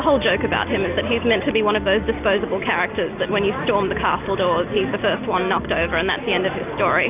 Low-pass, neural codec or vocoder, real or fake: 3.6 kHz; none; real